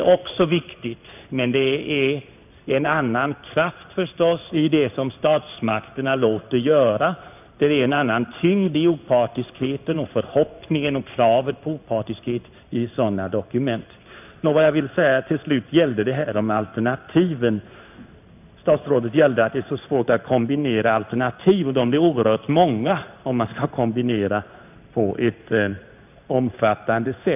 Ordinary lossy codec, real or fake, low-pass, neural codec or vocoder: none; fake; 3.6 kHz; codec, 16 kHz in and 24 kHz out, 1 kbps, XY-Tokenizer